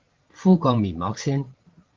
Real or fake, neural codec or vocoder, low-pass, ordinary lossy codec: fake; vocoder, 44.1 kHz, 80 mel bands, Vocos; 7.2 kHz; Opus, 16 kbps